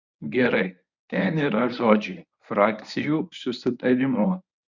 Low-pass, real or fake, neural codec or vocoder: 7.2 kHz; fake; codec, 24 kHz, 0.9 kbps, WavTokenizer, medium speech release version 1